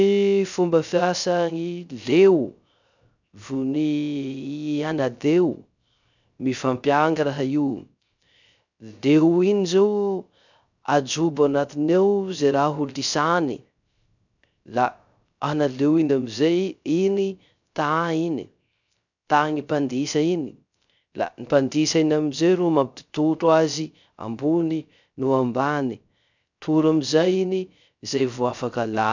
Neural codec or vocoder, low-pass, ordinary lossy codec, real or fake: codec, 16 kHz, 0.3 kbps, FocalCodec; 7.2 kHz; none; fake